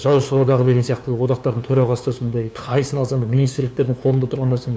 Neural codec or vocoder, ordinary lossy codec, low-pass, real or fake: codec, 16 kHz, 2 kbps, FunCodec, trained on LibriTTS, 25 frames a second; none; none; fake